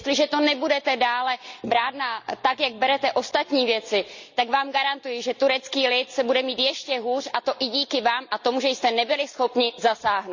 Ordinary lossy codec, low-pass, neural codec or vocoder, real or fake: Opus, 64 kbps; 7.2 kHz; none; real